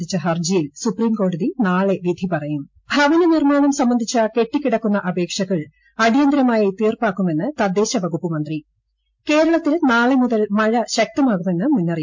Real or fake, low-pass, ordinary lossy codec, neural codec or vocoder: real; 7.2 kHz; MP3, 48 kbps; none